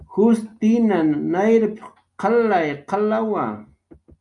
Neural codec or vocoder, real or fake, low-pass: none; real; 10.8 kHz